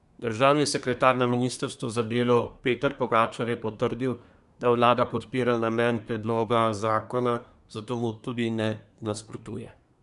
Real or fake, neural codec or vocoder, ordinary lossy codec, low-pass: fake; codec, 24 kHz, 1 kbps, SNAC; none; 10.8 kHz